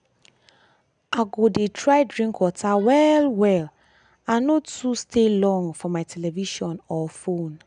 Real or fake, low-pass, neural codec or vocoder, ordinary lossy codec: real; 9.9 kHz; none; none